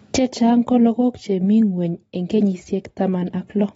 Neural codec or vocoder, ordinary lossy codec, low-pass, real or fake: none; AAC, 24 kbps; 19.8 kHz; real